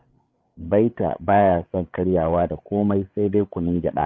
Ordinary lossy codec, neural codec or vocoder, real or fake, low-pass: none; codec, 16 kHz, 8 kbps, FreqCodec, larger model; fake; none